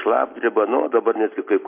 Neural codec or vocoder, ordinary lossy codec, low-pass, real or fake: none; MP3, 24 kbps; 3.6 kHz; real